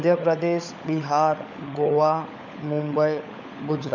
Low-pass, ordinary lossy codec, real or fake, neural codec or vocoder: 7.2 kHz; none; fake; codec, 16 kHz, 16 kbps, FunCodec, trained on LibriTTS, 50 frames a second